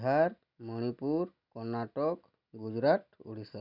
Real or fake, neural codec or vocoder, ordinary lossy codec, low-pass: real; none; none; 5.4 kHz